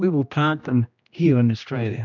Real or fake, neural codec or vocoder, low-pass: fake; codec, 16 kHz, 1 kbps, X-Codec, HuBERT features, trained on general audio; 7.2 kHz